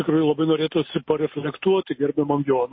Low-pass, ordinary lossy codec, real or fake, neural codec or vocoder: 7.2 kHz; MP3, 24 kbps; real; none